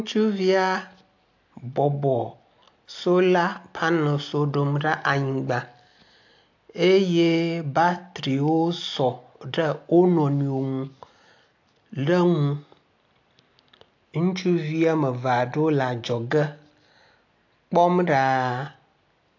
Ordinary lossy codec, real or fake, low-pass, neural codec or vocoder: AAC, 48 kbps; real; 7.2 kHz; none